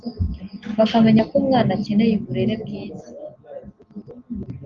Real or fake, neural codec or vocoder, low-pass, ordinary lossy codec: real; none; 7.2 kHz; Opus, 32 kbps